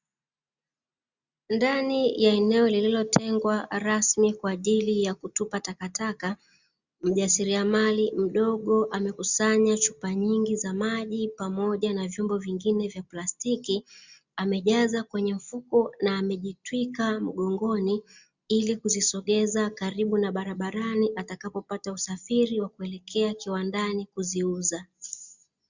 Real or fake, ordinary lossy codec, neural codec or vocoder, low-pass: real; Opus, 64 kbps; none; 7.2 kHz